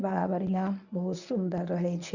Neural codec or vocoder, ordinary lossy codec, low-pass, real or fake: codec, 24 kHz, 0.9 kbps, WavTokenizer, medium speech release version 1; none; 7.2 kHz; fake